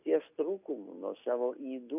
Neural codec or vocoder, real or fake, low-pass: none; real; 3.6 kHz